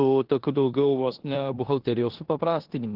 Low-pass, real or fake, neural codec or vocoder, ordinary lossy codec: 5.4 kHz; fake; codec, 16 kHz in and 24 kHz out, 0.9 kbps, LongCat-Audio-Codec, four codebook decoder; Opus, 16 kbps